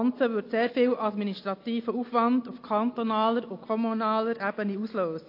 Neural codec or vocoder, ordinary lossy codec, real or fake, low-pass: none; AAC, 32 kbps; real; 5.4 kHz